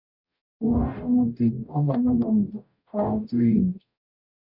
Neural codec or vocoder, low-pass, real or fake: codec, 44.1 kHz, 0.9 kbps, DAC; 5.4 kHz; fake